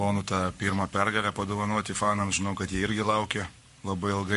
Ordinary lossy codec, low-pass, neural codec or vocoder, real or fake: MP3, 48 kbps; 14.4 kHz; codec, 44.1 kHz, 7.8 kbps, Pupu-Codec; fake